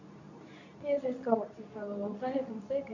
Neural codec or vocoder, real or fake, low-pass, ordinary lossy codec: codec, 24 kHz, 0.9 kbps, WavTokenizer, medium speech release version 2; fake; 7.2 kHz; none